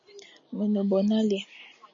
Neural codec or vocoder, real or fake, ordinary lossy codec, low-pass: none; real; MP3, 32 kbps; 7.2 kHz